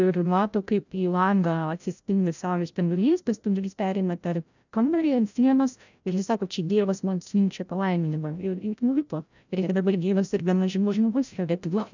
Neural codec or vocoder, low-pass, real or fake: codec, 16 kHz, 0.5 kbps, FreqCodec, larger model; 7.2 kHz; fake